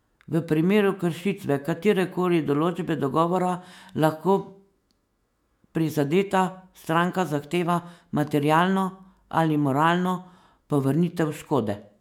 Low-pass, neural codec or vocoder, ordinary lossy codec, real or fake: 19.8 kHz; autoencoder, 48 kHz, 128 numbers a frame, DAC-VAE, trained on Japanese speech; MP3, 96 kbps; fake